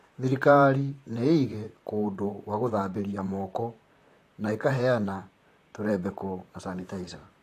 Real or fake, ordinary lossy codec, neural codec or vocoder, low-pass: fake; AAC, 64 kbps; vocoder, 44.1 kHz, 128 mel bands, Pupu-Vocoder; 14.4 kHz